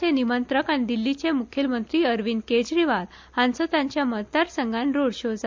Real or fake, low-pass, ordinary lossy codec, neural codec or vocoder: real; 7.2 kHz; MP3, 64 kbps; none